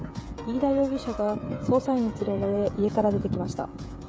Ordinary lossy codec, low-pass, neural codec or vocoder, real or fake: none; none; codec, 16 kHz, 16 kbps, FreqCodec, smaller model; fake